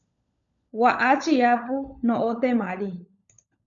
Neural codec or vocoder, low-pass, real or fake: codec, 16 kHz, 16 kbps, FunCodec, trained on LibriTTS, 50 frames a second; 7.2 kHz; fake